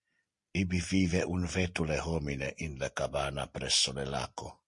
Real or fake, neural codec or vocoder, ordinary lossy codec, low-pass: real; none; MP3, 48 kbps; 10.8 kHz